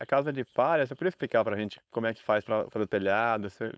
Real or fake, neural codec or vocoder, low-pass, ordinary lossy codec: fake; codec, 16 kHz, 4.8 kbps, FACodec; none; none